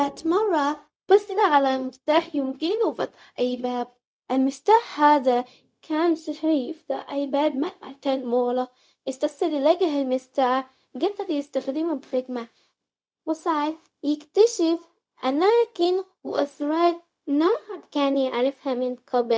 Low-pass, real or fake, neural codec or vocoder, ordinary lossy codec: none; fake; codec, 16 kHz, 0.4 kbps, LongCat-Audio-Codec; none